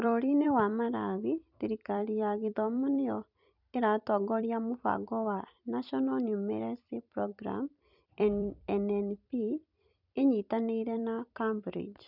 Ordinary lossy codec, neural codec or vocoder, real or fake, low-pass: none; vocoder, 44.1 kHz, 128 mel bands every 256 samples, BigVGAN v2; fake; 5.4 kHz